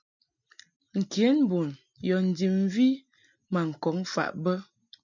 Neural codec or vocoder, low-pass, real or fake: none; 7.2 kHz; real